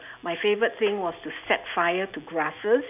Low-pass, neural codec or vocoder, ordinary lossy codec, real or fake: 3.6 kHz; none; none; real